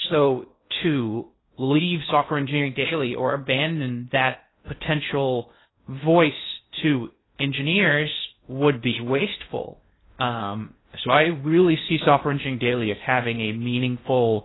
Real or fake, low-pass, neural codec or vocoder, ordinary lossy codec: fake; 7.2 kHz; codec, 16 kHz in and 24 kHz out, 0.6 kbps, FocalCodec, streaming, 2048 codes; AAC, 16 kbps